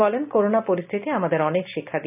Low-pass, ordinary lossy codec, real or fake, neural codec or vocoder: 3.6 kHz; none; real; none